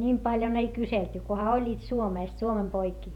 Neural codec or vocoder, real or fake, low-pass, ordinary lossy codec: vocoder, 48 kHz, 128 mel bands, Vocos; fake; 19.8 kHz; none